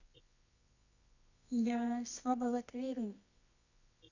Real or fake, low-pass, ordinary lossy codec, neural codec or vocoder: fake; 7.2 kHz; none; codec, 24 kHz, 0.9 kbps, WavTokenizer, medium music audio release